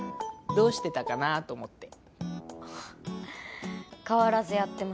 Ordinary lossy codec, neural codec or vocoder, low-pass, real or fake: none; none; none; real